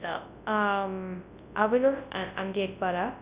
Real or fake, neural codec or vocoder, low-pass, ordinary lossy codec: fake; codec, 24 kHz, 0.9 kbps, WavTokenizer, large speech release; 3.6 kHz; Opus, 64 kbps